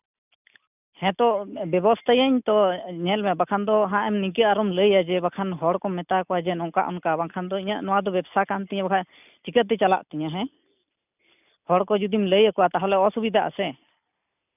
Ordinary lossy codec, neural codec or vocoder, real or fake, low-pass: none; none; real; 3.6 kHz